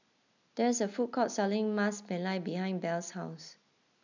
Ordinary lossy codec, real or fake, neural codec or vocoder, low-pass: none; real; none; 7.2 kHz